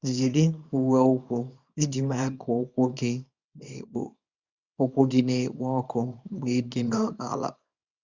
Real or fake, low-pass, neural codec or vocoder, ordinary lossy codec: fake; 7.2 kHz; codec, 24 kHz, 0.9 kbps, WavTokenizer, small release; Opus, 64 kbps